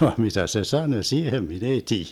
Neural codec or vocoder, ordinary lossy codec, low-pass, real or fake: none; none; 19.8 kHz; real